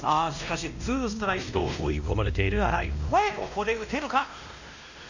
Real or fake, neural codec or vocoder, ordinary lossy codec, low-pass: fake; codec, 16 kHz, 1 kbps, X-Codec, WavLM features, trained on Multilingual LibriSpeech; none; 7.2 kHz